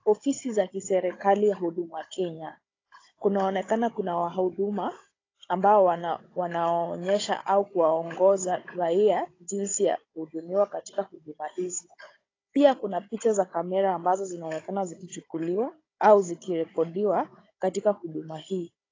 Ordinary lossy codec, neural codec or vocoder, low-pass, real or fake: AAC, 32 kbps; codec, 16 kHz, 16 kbps, FunCodec, trained on Chinese and English, 50 frames a second; 7.2 kHz; fake